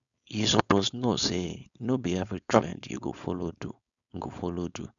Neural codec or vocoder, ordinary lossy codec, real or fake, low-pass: codec, 16 kHz, 4.8 kbps, FACodec; none; fake; 7.2 kHz